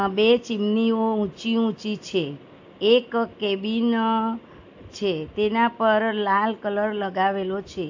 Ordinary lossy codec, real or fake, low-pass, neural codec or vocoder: AAC, 48 kbps; real; 7.2 kHz; none